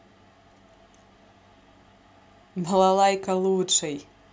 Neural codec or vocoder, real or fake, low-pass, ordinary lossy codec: none; real; none; none